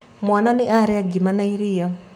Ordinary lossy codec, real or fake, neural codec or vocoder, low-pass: MP3, 96 kbps; fake; codec, 44.1 kHz, 7.8 kbps, Pupu-Codec; 19.8 kHz